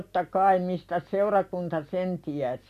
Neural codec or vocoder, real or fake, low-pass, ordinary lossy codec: none; real; 14.4 kHz; none